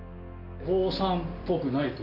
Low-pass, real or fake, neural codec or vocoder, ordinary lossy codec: 5.4 kHz; real; none; none